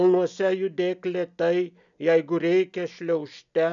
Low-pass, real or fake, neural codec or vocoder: 7.2 kHz; real; none